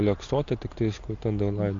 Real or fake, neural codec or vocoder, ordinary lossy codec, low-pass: real; none; AAC, 48 kbps; 7.2 kHz